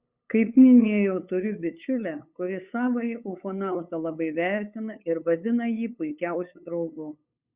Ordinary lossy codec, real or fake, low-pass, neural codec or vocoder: Opus, 64 kbps; fake; 3.6 kHz; codec, 16 kHz, 8 kbps, FunCodec, trained on LibriTTS, 25 frames a second